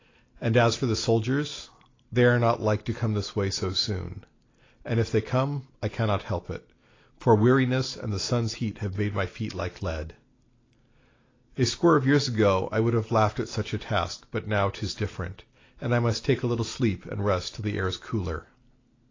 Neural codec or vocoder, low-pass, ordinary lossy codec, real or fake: none; 7.2 kHz; AAC, 32 kbps; real